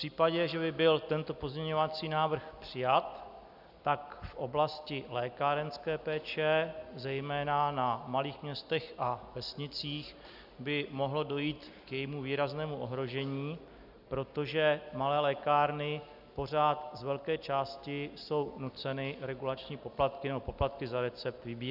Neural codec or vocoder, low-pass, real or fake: none; 5.4 kHz; real